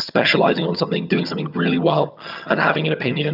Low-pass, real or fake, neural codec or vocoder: 5.4 kHz; fake; vocoder, 22.05 kHz, 80 mel bands, HiFi-GAN